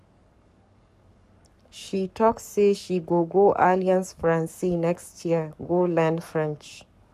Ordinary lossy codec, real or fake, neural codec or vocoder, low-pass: none; fake; codec, 44.1 kHz, 7.8 kbps, Pupu-Codec; 14.4 kHz